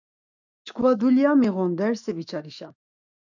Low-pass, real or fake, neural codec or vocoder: 7.2 kHz; fake; autoencoder, 48 kHz, 128 numbers a frame, DAC-VAE, trained on Japanese speech